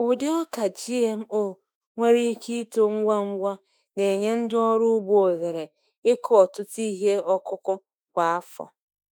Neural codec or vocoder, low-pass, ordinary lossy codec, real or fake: autoencoder, 48 kHz, 32 numbers a frame, DAC-VAE, trained on Japanese speech; none; none; fake